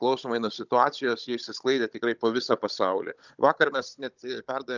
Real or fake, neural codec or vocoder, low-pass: fake; codec, 16 kHz, 16 kbps, FunCodec, trained on Chinese and English, 50 frames a second; 7.2 kHz